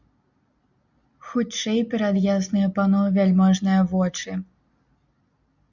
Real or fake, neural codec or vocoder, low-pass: real; none; 7.2 kHz